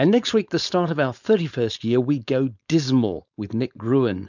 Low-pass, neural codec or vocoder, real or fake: 7.2 kHz; codec, 16 kHz, 4.8 kbps, FACodec; fake